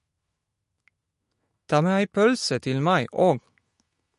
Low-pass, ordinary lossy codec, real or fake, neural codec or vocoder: 14.4 kHz; MP3, 48 kbps; fake; autoencoder, 48 kHz, 128 numbers a frame, DAC-VAE, trained on Japanese speech